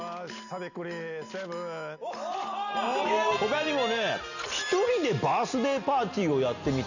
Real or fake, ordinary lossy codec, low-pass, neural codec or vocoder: real; none; 7.2 kHz; none